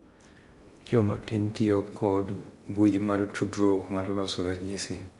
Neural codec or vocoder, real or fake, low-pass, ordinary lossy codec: codec, 16 kHz in and 24 kHz out, 0.6 kbps, FocalCodec, streaming, 2048 codes; fake; 10.8 kHz; Opus, 64 kbps